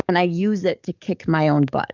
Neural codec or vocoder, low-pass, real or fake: codec, 44.1 kHz, 7.8 kbps, DAC; 7.2 kHz; fake